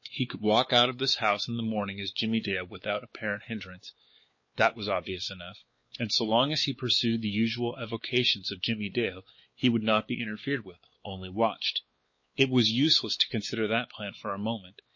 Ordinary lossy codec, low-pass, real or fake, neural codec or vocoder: MP3, 32 kbps; 7.2 kHz; fake; codec, 16 kHz, 4 kbps, X-Codec, WavLM features, trained on Multilingual LibriSpeech